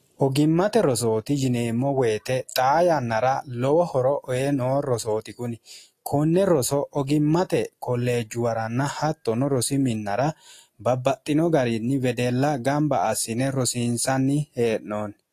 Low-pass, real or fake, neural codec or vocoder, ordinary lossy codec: 14.4 kHz; real; none; AAC, 48 kbps